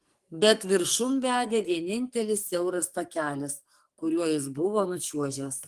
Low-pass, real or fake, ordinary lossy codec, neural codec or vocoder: 14.4 kHz; fake; Opus, 24 kbps; codec, 44.1 kHz, 2.6 kbps, SNAC